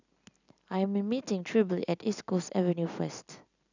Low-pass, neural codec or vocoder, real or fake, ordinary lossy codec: 7.2 kHz; none; real; none